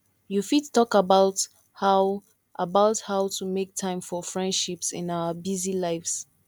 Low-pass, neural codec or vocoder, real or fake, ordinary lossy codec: 19.8 kHz; none; real; none